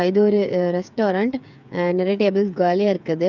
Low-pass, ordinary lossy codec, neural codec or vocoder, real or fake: 7.2 kHz; none; codec, 16 kHz, 8 kbps, FreqCodec, smaller model; fake